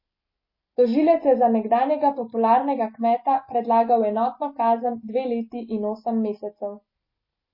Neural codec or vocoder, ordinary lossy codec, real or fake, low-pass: none; MP3, 24 kbps; real; 5.4 kHz